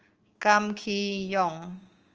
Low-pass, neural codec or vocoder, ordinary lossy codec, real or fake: 7.2 kHz; codec, 16 kHz, 6 kbps, DAC; Opus, 24 kbps; fake